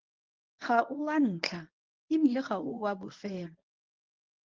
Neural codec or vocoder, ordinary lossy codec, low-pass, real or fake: codec, 24 kHz, 0.9 kbps, WavTokenizer, medium speech release version 1; Opus, 32 kbps; 7.2 kHz; fake